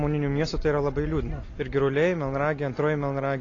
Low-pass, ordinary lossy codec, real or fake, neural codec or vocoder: 7.2 kHz; AAC, 32 kbps; real; none